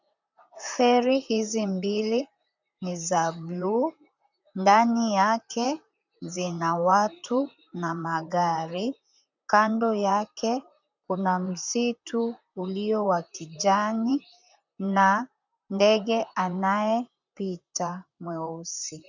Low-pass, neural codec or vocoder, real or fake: 7.2 kHz; vocoder, 44.1 kHz, 128 mel bands, Pupu-Vocoder; fake